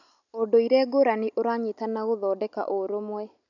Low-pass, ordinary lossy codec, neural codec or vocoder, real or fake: 7.2 kHz; none; none; real